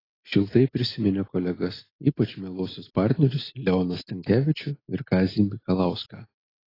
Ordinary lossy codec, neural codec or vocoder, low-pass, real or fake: AAC, 24 kbps; none; 5.4 kHz; real